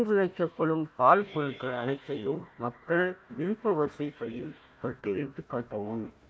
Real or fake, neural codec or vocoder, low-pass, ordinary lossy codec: fake; codec, 16 kHz, 1 kbps, FunCodec, trained on Chinese and English, 50 frames a second; none; none